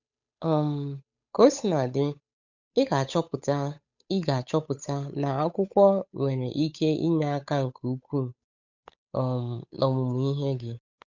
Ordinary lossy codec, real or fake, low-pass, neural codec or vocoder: none; fake; 7.2 kHz; codec, 16 kHz, 8 kbps, FunCodec, trained on Chinese and English, 25 frames a second